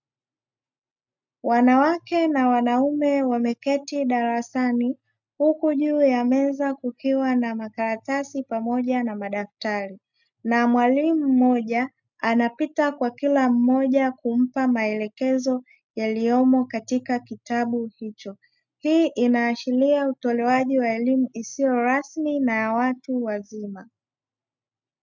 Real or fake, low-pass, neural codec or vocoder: real; 7.2 kHz; none